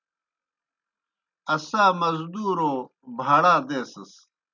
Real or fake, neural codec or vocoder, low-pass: real; none; 7.2 kHz